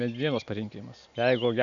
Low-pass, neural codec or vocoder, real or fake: 7.2 kHz; none; real